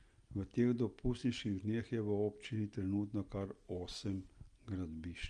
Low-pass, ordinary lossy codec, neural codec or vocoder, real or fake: 9.9 kHz; Opus, 32 kbps; none; real